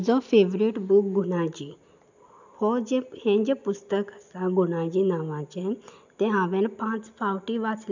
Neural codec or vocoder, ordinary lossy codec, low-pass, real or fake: none; none; 7.2 kHz; real